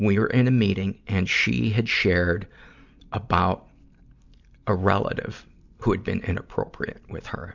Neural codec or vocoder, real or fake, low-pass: none; real; 7.2 kHz